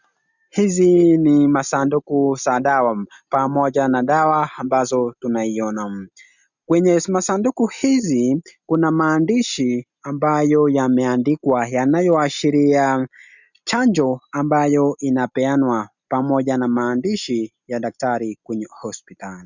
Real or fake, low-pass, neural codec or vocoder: real; 7.2 kHz; none